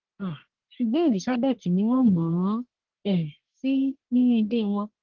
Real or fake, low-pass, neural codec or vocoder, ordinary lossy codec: fake; 7.2 kHz; codec, 44.1 kHz, 1.7 kbps, Pupu-Codec; Opus, 16 kbps